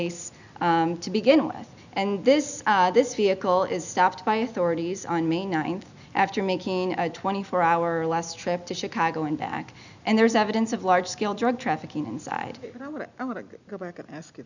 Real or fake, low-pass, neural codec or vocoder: real; 7.2 kHz; none